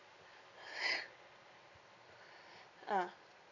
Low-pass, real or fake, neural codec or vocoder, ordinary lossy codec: 7.2 kHz; real; none; none